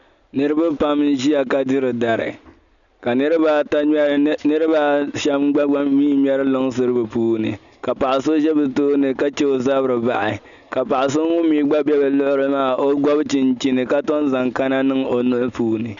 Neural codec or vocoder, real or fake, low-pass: none; real; 7.2 kHz